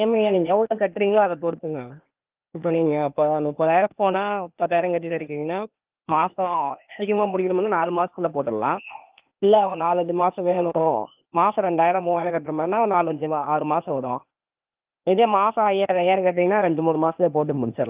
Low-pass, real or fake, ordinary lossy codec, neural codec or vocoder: 3.6 kHz; fake; Opus, 32 kbps; codec, 16 kHz, 0.8 kbps, ZipCodec